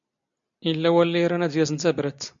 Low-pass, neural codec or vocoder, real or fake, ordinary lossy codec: 7.2 kHz; none; real; MP3, 64 kbps